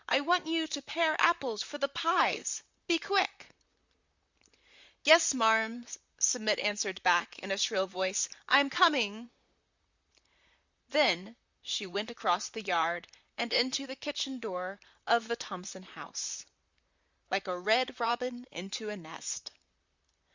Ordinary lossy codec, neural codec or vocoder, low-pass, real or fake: Opus, 64 kbps; vocoder, 44.1 kHz, 128 mel bands, Pupu-Vocoder; 7.2 kHz; fake